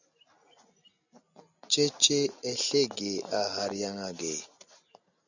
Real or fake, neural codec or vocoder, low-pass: real; none; 7.2 kHz